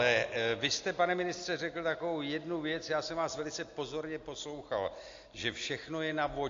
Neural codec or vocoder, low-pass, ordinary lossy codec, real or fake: none; 7.2 kHz; AAC, 48 kbps; real